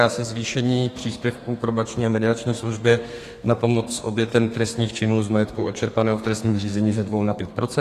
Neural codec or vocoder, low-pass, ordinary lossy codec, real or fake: codec, 32 kHz, 1.9 kbps, SNAC; 14.4 kHz; AAC, 48 kbps; fake